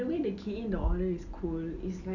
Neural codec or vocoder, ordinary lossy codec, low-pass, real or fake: none; none; 7.2 kHz; real